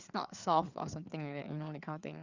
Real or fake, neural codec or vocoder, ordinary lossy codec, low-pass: fake; codec, 16 kHz, 4 kbps, FreqCodec, larger model; Opus, 64 kbps; 7.2 kHz